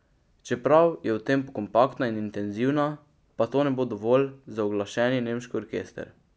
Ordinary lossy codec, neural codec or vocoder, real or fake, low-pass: none; none; real; none